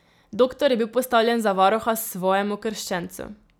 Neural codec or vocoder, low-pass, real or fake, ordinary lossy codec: none; none; real; none